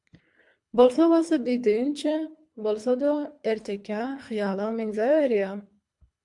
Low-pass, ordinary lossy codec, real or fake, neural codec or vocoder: 10.8 kHz; MP3, 64 kbps; fake; codec, 24 kHz, 3 kbps, HILCodec